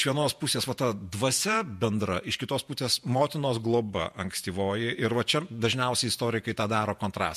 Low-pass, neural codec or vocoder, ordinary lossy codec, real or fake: 14.4 kHz; vocoder, 48 kHz, 128 mel bands, Vocos; MP3, 64 kbps; fake